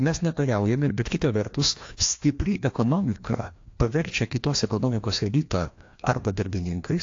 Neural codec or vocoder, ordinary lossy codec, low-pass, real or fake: codec, 16 kHz, 1 kbps, FreqCodec, larger model; AAC, 48 kbps; 7.2 kHz; fake